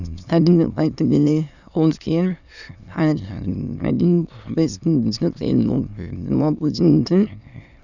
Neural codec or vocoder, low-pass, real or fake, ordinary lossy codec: autoencoder, 22.05 kHz, a latent of 192 numbers a frame, VITS, trained on many speakers; 7.2 kHz; fake; none